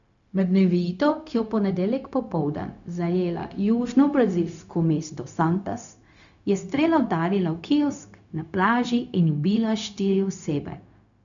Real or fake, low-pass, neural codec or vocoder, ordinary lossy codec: fake; 7.2 kHz; codec, 16 kHz, 0.4 kbps, LongCat-Audio-Codec; none